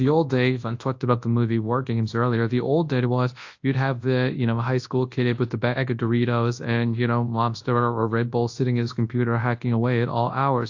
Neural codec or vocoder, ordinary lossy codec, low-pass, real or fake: codec, 24 kHz, 0.9 kbps, WavTokenizer, large speech release; AAC, 48 kbps; 7.2 kHz; fake